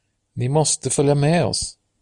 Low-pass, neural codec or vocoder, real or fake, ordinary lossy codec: 10.8 kHz; none; real; Opus, 64 kbps